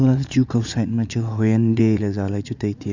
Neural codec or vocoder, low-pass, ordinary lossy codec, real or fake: vocoder, 44.1 kHz, 80 mel bands, Vocos; 7.2 kHz; none; fake